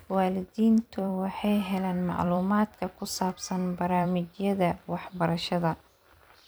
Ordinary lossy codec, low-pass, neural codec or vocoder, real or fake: none; none; vocoder, 44.1 kHz, 128 mel bands every 256 samples, BigVGAN v2; fake